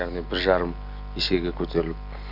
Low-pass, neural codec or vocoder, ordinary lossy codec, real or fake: 5.4 kHz; none; none; real